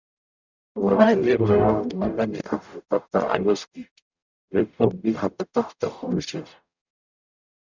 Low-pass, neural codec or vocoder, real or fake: 7.2 kHz; codec, 44.1 kHz, 0.9 kbps, DAC; fake